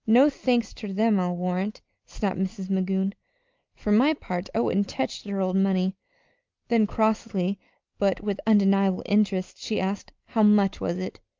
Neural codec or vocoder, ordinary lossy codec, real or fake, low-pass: none; Opus, 24 kbps; real; 7.2 kHz